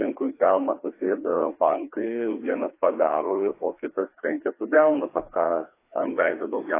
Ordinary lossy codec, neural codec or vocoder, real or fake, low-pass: AAC, 24 kbps; codec, 16 kHz, 2 kbps, FreqCodec, larger model; fake; 3.6 kHz